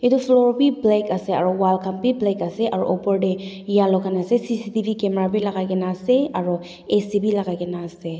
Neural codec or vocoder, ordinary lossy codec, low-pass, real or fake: none; none; none; real